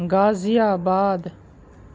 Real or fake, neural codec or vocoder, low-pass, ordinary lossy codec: real; none; none; none